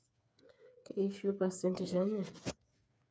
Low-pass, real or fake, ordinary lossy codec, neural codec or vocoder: none; fake; none; codec, 16 kHz, 8 kbps, FreqCodec, smaller model